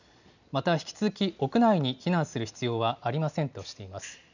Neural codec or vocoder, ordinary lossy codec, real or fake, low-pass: none; none; real; 7.2 kHz